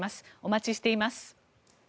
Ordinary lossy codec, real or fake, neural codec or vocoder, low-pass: none; real; none; none